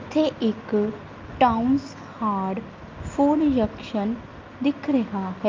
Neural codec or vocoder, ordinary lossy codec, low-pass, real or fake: none; Opus, 24 kbps; 7.2 kHz; real